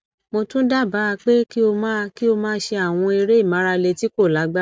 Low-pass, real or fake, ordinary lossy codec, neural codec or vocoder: none; real; none; none